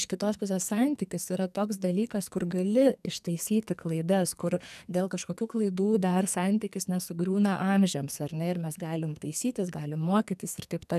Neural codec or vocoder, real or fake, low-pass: codec, 44.1 kHz, 2.6 kbps, SNAC; fake; 14.4 kHz